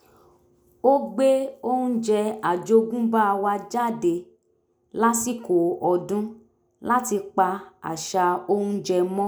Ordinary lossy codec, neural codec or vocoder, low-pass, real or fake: none; none; none; real